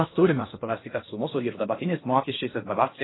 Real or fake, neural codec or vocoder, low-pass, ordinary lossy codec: fake; codec, 16 kHz in and 24 kHz out, 0.6 kbps, FocalCodec, streaming, 4096 codes; 7.2 kHz; AAC, 16 kbps